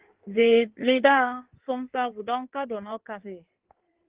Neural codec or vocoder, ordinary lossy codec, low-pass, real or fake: codec, 16 kHz in and 24 kHz out, 1.1 kbps, FireRedTTS-2 codec; Opus, 16 kbps; 3.6 kHz; fake